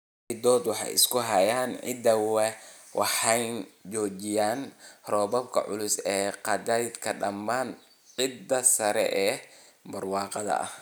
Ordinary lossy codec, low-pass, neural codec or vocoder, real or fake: none; none; none; real